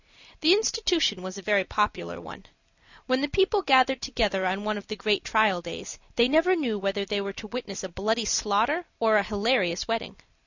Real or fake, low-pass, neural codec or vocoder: real; 7.2 kHz; none